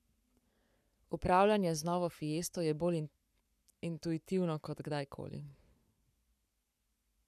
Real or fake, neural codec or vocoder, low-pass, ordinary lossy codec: fake; codec, 44.1 kHz, 7.8 kbps, Pupu-Codec; 14.4 kHz; none